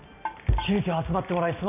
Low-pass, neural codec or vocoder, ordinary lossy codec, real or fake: 3.6 kHz; none; none; real